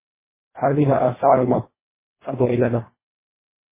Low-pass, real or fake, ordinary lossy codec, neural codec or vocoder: 3.6 kHz; fake; MP3, 16 kbps; codec, 24 kHz, 1.5 kbps, HILCodec